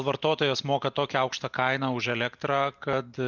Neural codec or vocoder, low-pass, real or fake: none; 7.2 kHz; real